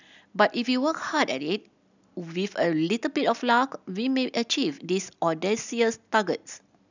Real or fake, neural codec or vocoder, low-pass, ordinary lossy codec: real; none; 7.2 kHz; none